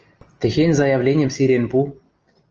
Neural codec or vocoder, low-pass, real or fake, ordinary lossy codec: none; 7.2 kHz; real; Opus, 24 kbps